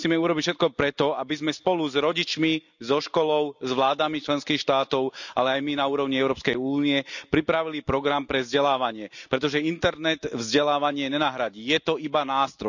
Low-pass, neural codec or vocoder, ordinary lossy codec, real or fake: 7.2 kHz; none; none; real